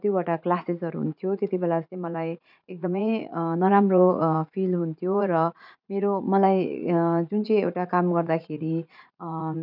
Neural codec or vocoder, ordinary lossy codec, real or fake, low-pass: vocoder, 44.1 kHz, 80 mel bands, Vocos; none; fake; 5.4 kHz